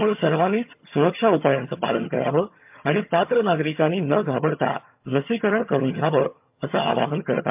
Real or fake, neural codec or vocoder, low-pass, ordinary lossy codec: fake; vocoder, 22.05 kHz, 80 mel bands, HiFi-GAN; 3.6 kHz; MP3, 32 kbps